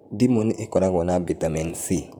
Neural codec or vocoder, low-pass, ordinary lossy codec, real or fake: vocoder, 44.1 kHz, 128 mel bands, Pupu-Vocoder; none; none; fake